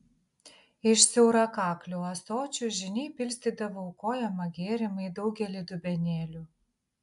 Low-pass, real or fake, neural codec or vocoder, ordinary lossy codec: 10.8 kHz; real; none; AAC, 96 kbps